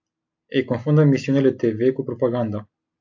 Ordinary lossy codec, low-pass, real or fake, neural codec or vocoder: AAC, 48 kbps; 7.2 kHz; real; none